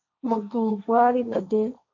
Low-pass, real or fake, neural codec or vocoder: 7.2 kHz; fake; codec, 16 kHz, 1.1 kbps, Voila-Tokenizer